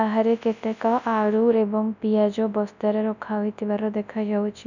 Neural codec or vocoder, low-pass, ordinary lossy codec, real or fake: codec, 24 kHz, 0.9 kbps, WavTokenizer, large speech release; 7.2 kHz; none; fake